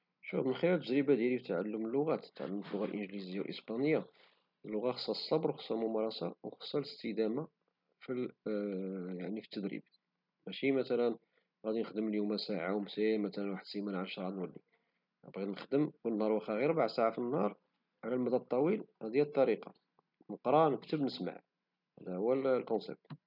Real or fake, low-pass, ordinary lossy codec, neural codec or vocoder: real; 5.4 kHz; none; none